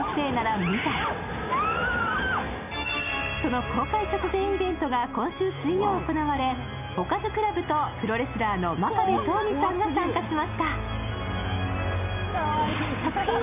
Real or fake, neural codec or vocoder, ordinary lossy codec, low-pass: real; none; none; 3.6 kHz